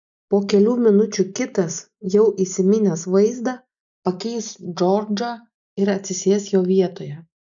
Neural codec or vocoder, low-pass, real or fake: none; 7.2 kHz; real